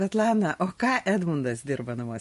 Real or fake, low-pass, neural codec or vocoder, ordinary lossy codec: fake; 14.4 kHz; vocoder, 44.1 kHz, 128 mel bands every 512 samples, BigVGAN v2; MP3, 48 kbps